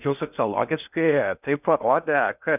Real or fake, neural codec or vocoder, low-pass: fake; codec, 16 kHz in and 24 kHz out, 0.6 kbps, FocalCodec, streaming, 4096 codes; 3.6 kHz